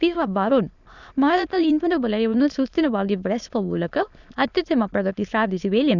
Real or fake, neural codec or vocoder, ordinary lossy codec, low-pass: fake; autoencoder, 22.05 kHz, a latent of 192 numbers a frame, VITS, trained on many speakers; none; 7.2 kHz